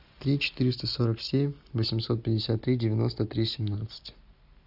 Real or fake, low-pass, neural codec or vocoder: real; 5.4 kHz; none